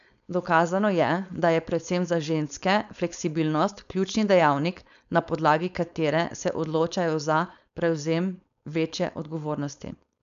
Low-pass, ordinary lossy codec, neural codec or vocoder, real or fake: 7.2 kHz; none; codec, 16 kHz, 4.8 kbps, FACodec; fake